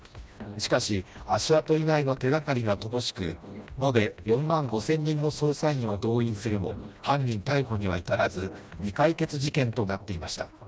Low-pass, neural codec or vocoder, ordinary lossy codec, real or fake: none; codec, 16 kHz, 1 kbps, FreqCodec, smaller model; none; fake